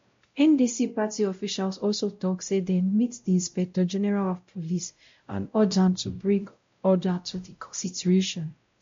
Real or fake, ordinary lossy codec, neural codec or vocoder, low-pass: fake; MP3, 48 kbps; codec, 16 kHz, 0.5 kbps, X-Codec, WavLM features, trained on Multilingual LibriSpeech; 7.2 kHz